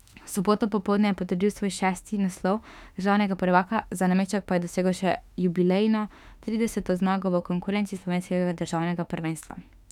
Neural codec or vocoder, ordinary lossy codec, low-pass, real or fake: autoencoder, 48 kHz, 32 numbers a frame, DAC-VAE, trained on Japanese speech; none; 19.8 kHz; fake